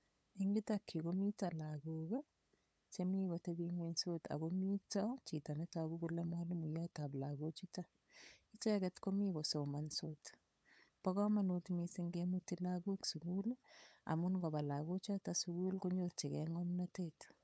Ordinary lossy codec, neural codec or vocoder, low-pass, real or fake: none; codec, 16 kHz, 8 kbps, FunCodec, trained on LibriTTS, 25 frames a second; none; fake